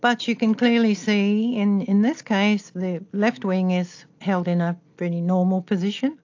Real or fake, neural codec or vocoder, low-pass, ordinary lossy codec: real; none; 7.2 kHz; AAC, 48 kbps